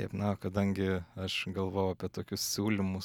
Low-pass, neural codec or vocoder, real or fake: 19.8 kHz; none; real